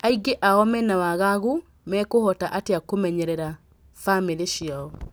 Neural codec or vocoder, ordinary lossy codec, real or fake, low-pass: none; none; real; none